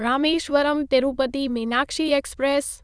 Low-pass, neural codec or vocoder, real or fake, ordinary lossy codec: none; autoencoder, 22.05 kHz, a latent of 192 numbers a frame, VITS, trained on many speakers; fake; none